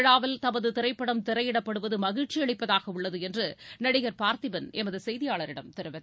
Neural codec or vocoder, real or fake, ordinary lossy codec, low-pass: none; real; none; none